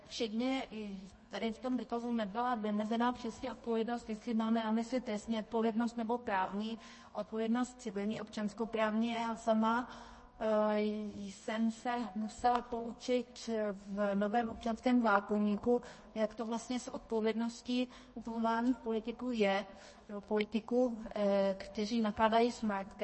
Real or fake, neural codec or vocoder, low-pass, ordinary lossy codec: fake; codec, 24 kHz, 0.9 kbps, WavTokenizer, medium music audio release; 10.8 kHz; MP3, 32 kbps